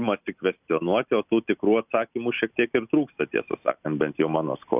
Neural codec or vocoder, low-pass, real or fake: none; 3.6 kHz; real